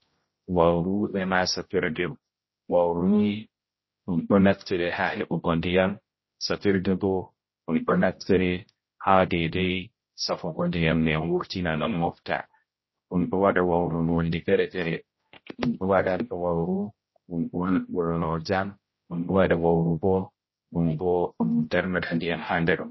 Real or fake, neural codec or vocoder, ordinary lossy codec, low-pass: fake; codec, 16 kHz, 0.5 kbps, X-Codec, HuBERT features, trained on general audio; MP3, 24 kbps; 7.2 kHz